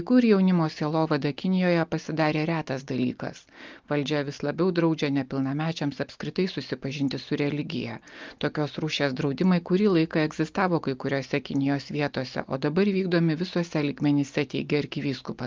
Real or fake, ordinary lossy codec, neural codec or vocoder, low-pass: real; Opus, 24 kbps; none; 7.2 kHz